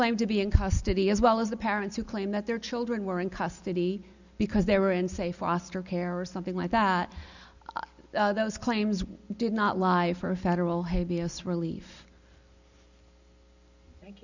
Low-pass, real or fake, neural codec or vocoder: 7.2 kHz; real; none